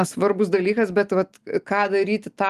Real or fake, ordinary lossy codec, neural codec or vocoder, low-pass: real; Opus, 32 kbps; none; 14.4 kHz